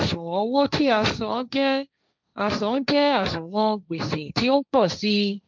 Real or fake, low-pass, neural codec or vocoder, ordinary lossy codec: fake; none; codec, 16 kHz, 1.1 kbps, Voila-Tokenizer; none